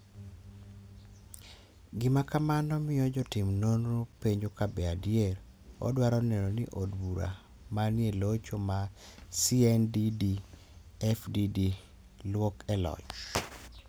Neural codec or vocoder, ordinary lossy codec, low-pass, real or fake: none; none; none; real